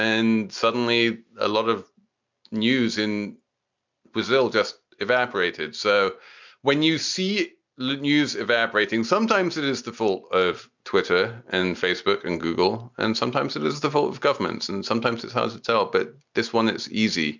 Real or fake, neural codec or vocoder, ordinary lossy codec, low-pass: real; none; MP3, 64 kbps; 7.2 kHz